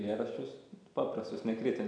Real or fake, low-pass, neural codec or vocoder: real; 9.9 kHz; none